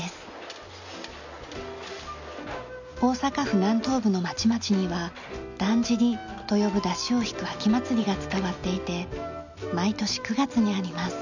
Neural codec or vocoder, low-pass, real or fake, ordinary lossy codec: none; 7.2 kHz; real; none